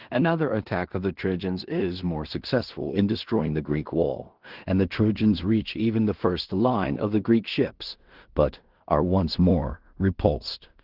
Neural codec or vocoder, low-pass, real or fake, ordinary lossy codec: codec, 16 kHz in and 24 kHz out, 0.4 kbps, LongCat-Audio-Codec, two codebook decoder; 5.4 kHz; fake; Opus, 16 kbps